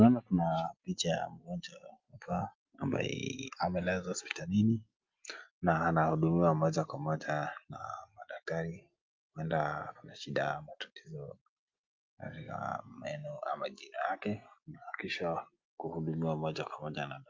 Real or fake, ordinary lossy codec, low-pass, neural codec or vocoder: real; Opus, 24 kbps; 7.2 kHz; none